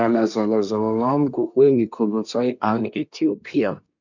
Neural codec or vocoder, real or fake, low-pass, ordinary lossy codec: codec, 24 kHz, 1 kbps, SNAC; fake; 7.2 kHz; none